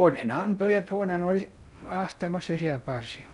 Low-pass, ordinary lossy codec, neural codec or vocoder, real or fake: 10.8 kHz; none; codec, 16 kHz in and 24 kHz out, 0.6 kbps, FocalCodec, streaming, 4096 codes; fake